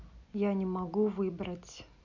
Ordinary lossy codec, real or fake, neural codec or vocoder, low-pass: none; real; none; 7.2 kHz